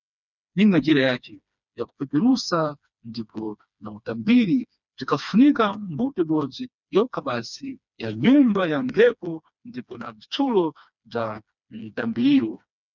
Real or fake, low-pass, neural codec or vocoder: fake; 7.2 kHz; codec, 16 kHz, 2 kbps, FreqCodec, smaller model